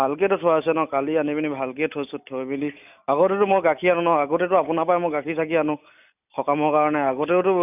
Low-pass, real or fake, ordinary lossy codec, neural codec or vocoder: 3.6 kHz; real; none; none